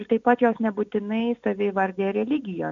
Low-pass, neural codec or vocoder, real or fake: 7.2 kHz; none; real